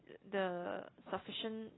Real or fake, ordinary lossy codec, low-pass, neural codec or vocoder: real; AAC, 16 kbps; 7.2 kHz; none